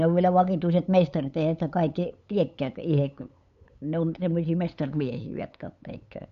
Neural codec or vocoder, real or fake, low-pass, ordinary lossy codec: codec, 16 kHz, 8 kbps, FunCodec, trained on LibriTTS, 25 frames a second; fake; 7.2 kHz; none